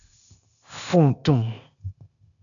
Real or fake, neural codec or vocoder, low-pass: fake; codec, 16 kHz, 0.8 kbps, ZipCodec; 7.2 kHz